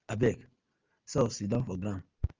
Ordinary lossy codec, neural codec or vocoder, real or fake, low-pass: Opus, 32 kbps; none; real; 7.2 kHz